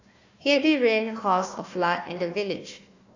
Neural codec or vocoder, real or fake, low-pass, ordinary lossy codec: codec, 16 kHz, 1 kbps, FunCodec, trained on Chinese and English, 50 frames a second; fake; 7.2 kHz; AAC, 48 kbps